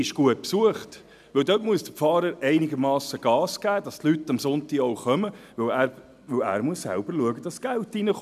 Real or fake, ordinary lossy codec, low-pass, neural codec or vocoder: real; none; 14.4 kHz; none